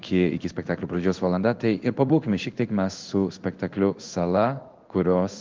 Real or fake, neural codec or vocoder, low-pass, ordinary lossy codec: fake; codec, 16 kHz in and 24 kHz out, 1 kbps, XY-Tokenizer; 7.2 kHz; Opus, 32 kbps